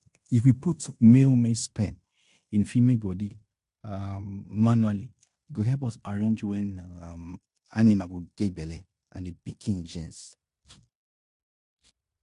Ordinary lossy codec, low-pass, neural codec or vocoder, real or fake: AAC, 64 kbps; 10.8 kHz; codec, 16 kHz in and 24 kHz out, 0.9 kbps, LongCat-Audio-Codec, fine tuned four codebook decoder; fake